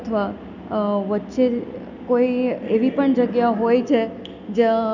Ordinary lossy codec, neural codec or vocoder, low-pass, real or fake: none; none; 7.2 kHz; real